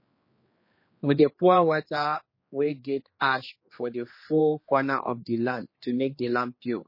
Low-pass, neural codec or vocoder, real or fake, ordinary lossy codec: 5.4 kHz; codec, 16 kHz, 2 kbps, X-Codec, HuBERT features, trained on general audio; fake; MP3, 24 kbps